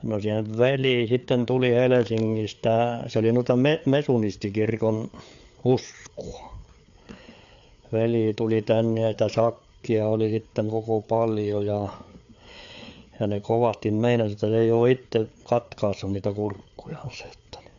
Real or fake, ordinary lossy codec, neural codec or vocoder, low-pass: fake; AAC, 64 kbps; codec, 16 kHz, 4 kbps, FreqCodec, larger model; 7.2 kHz